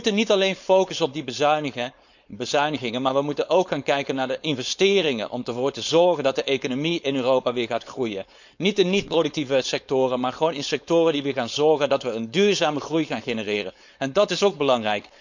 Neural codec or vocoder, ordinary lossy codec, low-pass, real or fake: codec, 16 kHz, 4.8 kbps, FACodec; none; 7.2 kHz; fake